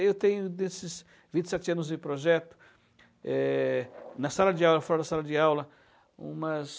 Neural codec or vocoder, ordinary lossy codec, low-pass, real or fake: none; none; none; real